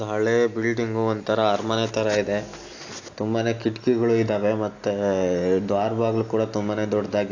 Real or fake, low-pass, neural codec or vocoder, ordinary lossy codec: real; 7.2 kHz; none; AAC, 48 kbps